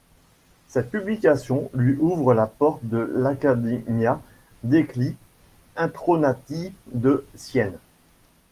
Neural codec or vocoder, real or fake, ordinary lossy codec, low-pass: none; real; Opus, 32 kbps; 14.4 kHz